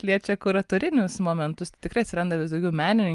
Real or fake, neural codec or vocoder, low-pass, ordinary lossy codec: real; none; 10.8 kHz; Opus, 32 kbps